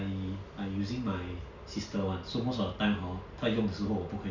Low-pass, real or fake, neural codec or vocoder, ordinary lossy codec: 7.2 kHz; real; none; none